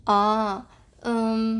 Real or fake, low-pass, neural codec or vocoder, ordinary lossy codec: real; 10.8 kHz; none; none